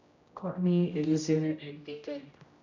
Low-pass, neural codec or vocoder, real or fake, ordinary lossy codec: 7.2 kHz; codec, 16 kHz, 0.5 kbps, X-Codec, HuBERT features, trained on general audio; fake; none